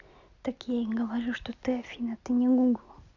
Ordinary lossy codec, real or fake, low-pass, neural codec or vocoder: none; real; 7.2 kHz; none